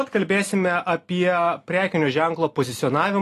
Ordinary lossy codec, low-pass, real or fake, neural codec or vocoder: AAC, 48 kbps; 14.4 kHz; real; none